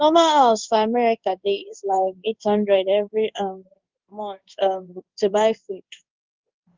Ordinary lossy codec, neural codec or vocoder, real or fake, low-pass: Opus, 32 kbps; codec, 16 kHz in and 24 kHz out, 1 kbps, XY-Tokenizer; fake; 7.2 kHz